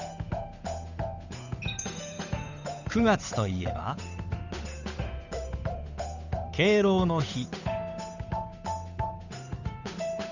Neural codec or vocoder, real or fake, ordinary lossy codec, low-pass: codec, 16 kHz, 8 kbps, FunCodec, trained on Chinese and English, 25 frames a second; fake; none; 7.2 kHz